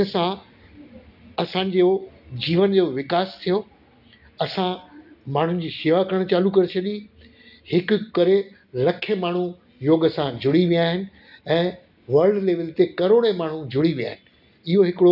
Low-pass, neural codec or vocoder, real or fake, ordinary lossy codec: 5.4 kHz; none; real; none